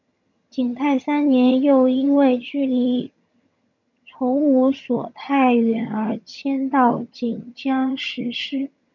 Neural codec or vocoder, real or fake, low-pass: vocoder, 22.05 kHz, 80 mel bands, HiFi-GAN; fake; 7.2 kHz